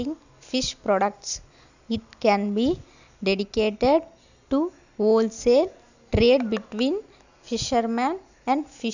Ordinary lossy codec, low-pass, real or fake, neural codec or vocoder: none; 7.2 kHz; real; none